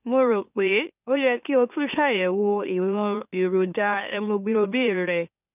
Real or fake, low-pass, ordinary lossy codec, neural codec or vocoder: fake; 3.6 kHz; none; autoencoder, 44.1 kHz, a latent of 192 numbers a frame, MeloTTS